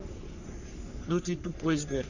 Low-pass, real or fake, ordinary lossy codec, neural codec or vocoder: 7.2 kHz; fake; none; codec, 44.1 kHz, 3.4 kbps, Pupu-Codec